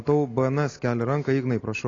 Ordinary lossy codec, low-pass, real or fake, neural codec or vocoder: AAC, 32 kbps; 7.2 kHz; real; none